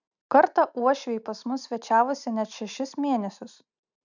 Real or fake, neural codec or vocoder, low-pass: real; none; 7.2 kHz